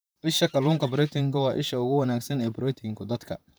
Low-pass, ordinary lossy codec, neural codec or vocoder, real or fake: none; none; vocoder, 44.1 kHz, 128 mel bands, Pupu-Vocoder; fake